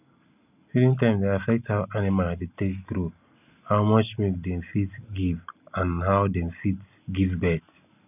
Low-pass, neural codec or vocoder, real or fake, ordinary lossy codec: 3.6 kHz; none; real; none